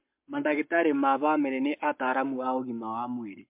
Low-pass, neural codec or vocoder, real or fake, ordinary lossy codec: 3.6 kHz; codec, 44.1 kHz, 7.8 kbps, Pupu-Codec; fake; MP3, 32 kbps